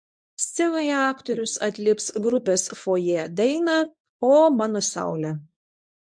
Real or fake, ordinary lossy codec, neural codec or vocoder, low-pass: fake; MP3, 64 kbps; codec, 24 kHz, 0.9 kbps, WavTokenizer, medium speech release version 1; 9.9 kHz